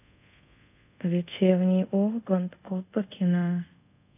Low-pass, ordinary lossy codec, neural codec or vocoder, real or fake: 3.6 kHz; AAC, 32 kbps; codec, 24 kHz, 0.5 kbps, DualCodec; fake